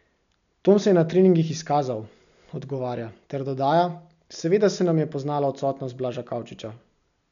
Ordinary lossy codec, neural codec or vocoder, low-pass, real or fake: none; none; 7.2 kHz; real